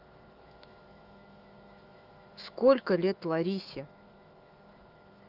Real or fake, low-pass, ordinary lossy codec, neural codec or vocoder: fake; 5.4 kHz; Opus, 32 kbps; autoencoder, 48 kHz, 128 numbers a frame, DAC-VAE, trained on Japanese speech